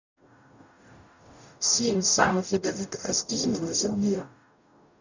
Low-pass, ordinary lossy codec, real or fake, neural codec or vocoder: 7.2 kHz; none; fake; codec, 44.1 kHz, 0.9 kbps, DAC